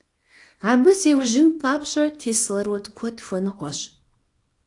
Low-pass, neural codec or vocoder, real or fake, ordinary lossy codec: 10.8 kHz; codec, 24 kHz, 0.9 kbps, WavTokenizer, small release; fake; AAC, 48 kbps